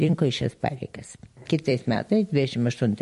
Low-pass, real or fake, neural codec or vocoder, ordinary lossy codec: 14.4 kHz; fake; vocoder, 44.1 kHz, 128 mel bands every 256 samples, BigVGAN v2; MP3, 48 kbps